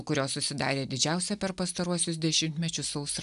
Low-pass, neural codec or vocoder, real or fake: 10.8 kHz; none; real